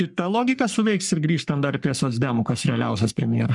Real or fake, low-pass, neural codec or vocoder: fake; 10.8 kHz; codec, 44.1 kHz, 3.4 kbps, Pupu-Codec